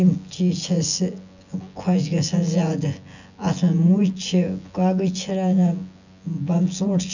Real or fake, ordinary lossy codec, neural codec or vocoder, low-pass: fake; none; vocoder, 24 kHz, 100 mel bands, Vocos; 7.2 kHz